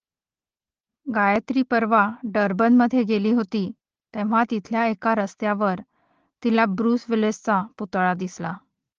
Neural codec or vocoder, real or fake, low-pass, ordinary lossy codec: none; real; 7.2 kHz; Opus, 16 kbps